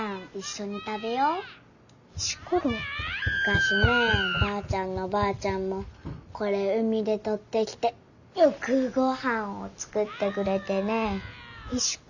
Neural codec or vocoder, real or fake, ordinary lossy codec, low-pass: none; real; none; 7.2 kHz